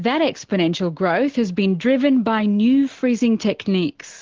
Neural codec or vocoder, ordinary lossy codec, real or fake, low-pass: none; Opus, 16 kbps; real; 7.2 kHz